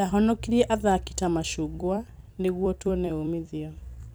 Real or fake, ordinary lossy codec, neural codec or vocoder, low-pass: fake; none; vocoder, 44.1 kHz, 128 mel bands every 256 samples, BigVGAN v2; none